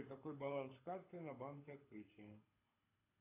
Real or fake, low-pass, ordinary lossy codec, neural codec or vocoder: fake; 3.6 kHz; AAC, 24 kbps; codec, 24 kHz, 6 kbps, HILCodec